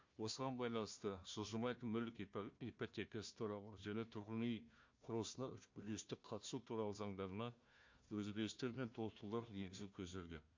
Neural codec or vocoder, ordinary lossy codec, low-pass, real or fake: codec, 16 kHz, 1 kbps, FunCodec, trained on Chinese and English, 50 frames a second; MP3, 48 kbps; 7.2 kHz; fake